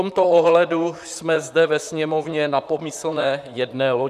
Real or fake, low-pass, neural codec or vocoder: fake; 14.4 kHz; vocoder, 44.1 kHz, 128 mel bands, Pupu-Vocoder